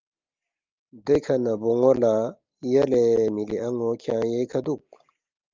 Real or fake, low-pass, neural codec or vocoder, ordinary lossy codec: real; 7.2 kHz; none; Opus, 24 kbps